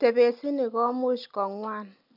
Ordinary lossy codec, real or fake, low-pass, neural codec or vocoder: none; real; 5.4 kHz; none